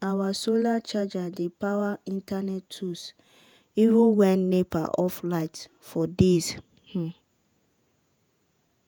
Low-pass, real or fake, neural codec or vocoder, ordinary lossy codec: none; fake; vocoder, 48 kHz, 128 mel bands, Vocos; none